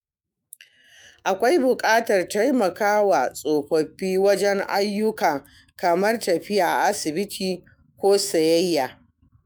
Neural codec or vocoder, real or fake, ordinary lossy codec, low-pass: autoencoder, 48 kHz, 128 numbers a frame, DAC-VAE, trained on Japanese speech; fake; none; none